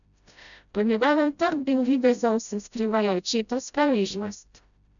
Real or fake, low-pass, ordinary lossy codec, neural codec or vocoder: fake; 7.2 kHz; none; codec, 16 kHz, 0.5 kbps, FreqCodec, smaller model